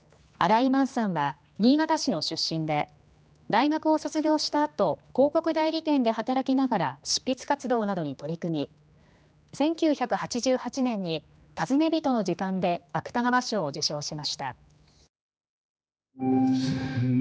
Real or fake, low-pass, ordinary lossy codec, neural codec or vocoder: fake; none; none; codec, 16 kHz, 2 kbps, X-Codec, HuBERT features, trained on general audio